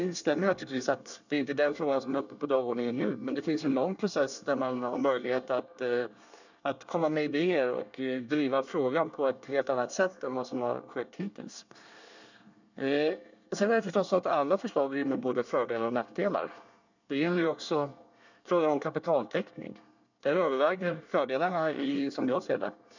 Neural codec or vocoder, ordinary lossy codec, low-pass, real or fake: codec, 24 kHz, 1 kbps, SNAC; none; 7.2 kHz; fake